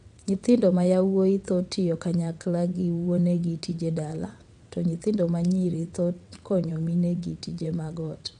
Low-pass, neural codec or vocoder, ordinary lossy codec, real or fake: 9.9 kHz; vocoder, 22.05 kHz, 80 mel bands, WaveNeXt; MP3, 96 kbps; fake